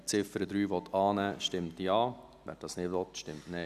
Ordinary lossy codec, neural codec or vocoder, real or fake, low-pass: none; none; real; 14.4 kHz